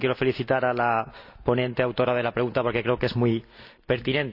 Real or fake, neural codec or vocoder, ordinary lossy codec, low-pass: real; none; none; 5.4 kHz